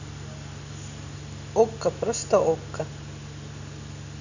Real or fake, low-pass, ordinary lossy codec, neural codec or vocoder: real; 7.2 kHz; none; none